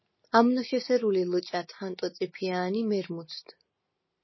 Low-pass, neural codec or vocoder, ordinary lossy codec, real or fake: 7.2 kHz; none; MP3, 24 kbps; real